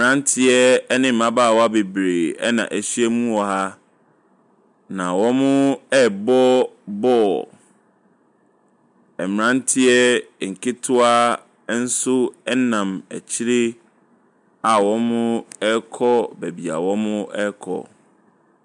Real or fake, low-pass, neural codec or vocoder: real; 10.8 kHz; none